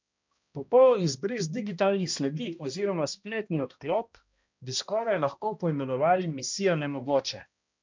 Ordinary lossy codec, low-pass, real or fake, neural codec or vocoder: MP3, 64 kbps; 7.2 kHz; fake; codec, 16 kHz, 1 kbps, X-Codec, HuBERT features, trained on balanced general audio